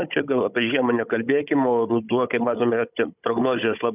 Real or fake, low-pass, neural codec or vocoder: fake; 3.6 kHz; codec, 16 kHz, 16 kbps, FunCodec, trained on Chinese and English, 50 frames a second